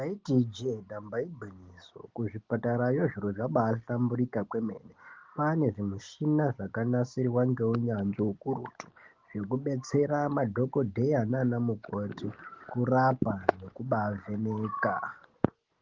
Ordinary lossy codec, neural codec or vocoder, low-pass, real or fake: Opus, 16 kbps; none; 7.2 kHz; real